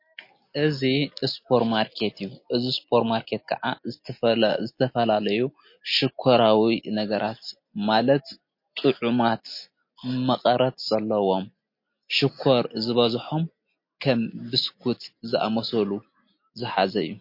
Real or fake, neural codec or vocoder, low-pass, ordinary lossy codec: real; none; 5.4 kHz; MP3, 32 kbps